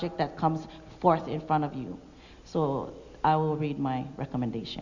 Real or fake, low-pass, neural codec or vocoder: real; 7.2 kHz; none